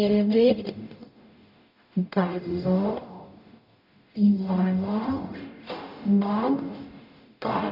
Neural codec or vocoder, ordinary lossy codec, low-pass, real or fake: codec, 44.1 kHz, 0.9 kbps, DAC; none; 5.4 kHz; fake